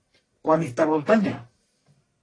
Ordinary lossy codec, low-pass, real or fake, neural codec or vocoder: AAC, 32 kbps; 9.9 kHz; fake; codec, 44.1 kHz, 1.7 kbps, Pupu-Codec